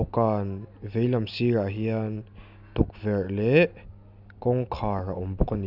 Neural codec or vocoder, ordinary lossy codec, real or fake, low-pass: none; none; real; 5.4 kHz